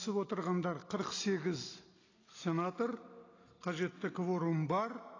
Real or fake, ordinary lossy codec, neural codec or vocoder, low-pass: real; AAC, 32 kbps; none; 7.2 kHz